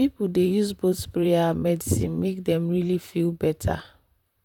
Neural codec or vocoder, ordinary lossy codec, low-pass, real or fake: vocoder, 44.1 kHz, 128 mel bands, Pupu-Vocoder; none; 19.8 kHz; fake